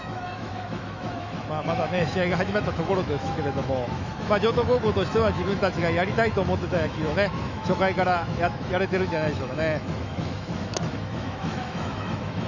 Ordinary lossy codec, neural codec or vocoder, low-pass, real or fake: none; autoencoder, 48 kHz, 128 numbers a frame, DAC-VAE, trained on Japanese speech; 7.2 kHz; fake